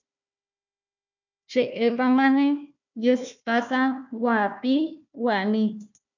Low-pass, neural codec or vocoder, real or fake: 7.2 kHz; codec, 16 kHz, 1 kbps, FunCodec, trained on Chinese and English, 50 frames a second; fake